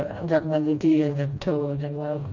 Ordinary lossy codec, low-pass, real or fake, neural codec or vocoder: none; 7.2 kHz; fake; codec, 16 kHz, 1 kbps, FreqCodec, smaller model